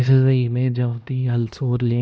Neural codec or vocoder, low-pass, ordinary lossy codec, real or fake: codec, 16 kHz, 1 kbps, X-Codec, HuBERT features, trained on LibriSpeech; none; none; fake